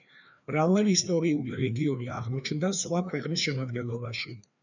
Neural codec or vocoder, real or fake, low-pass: codec, 16 kHz, 2 kbps, FreqCodec, larger model; fake; 7.2 kHz